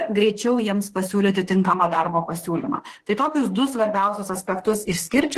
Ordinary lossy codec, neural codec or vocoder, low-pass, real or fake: Opus, 16 kbps; autoencoder, 48 kHz, 32 numbers a frame, DAC-VAE, trained on Japanese speech; 14.4 kHz; fake